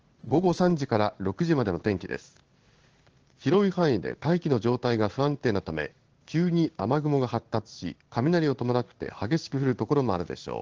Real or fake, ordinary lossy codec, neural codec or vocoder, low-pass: fake; Opus, 16 kbps; codec, 16 kHz in and 24 kHz out, 1 kbps, XY-Tokenizer; 7.2 kHz